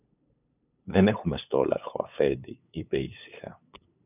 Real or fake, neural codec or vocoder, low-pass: fake; codec, 16 kHz, 8 kbps, FunCodec, trained on LibriTTS, 25 frames a second; 3.6 kHz